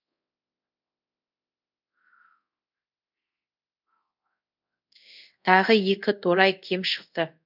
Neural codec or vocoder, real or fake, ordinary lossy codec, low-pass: codec, 24 kHz, 0.5 kbps, DualCodec; fake; none; 5.4 kHz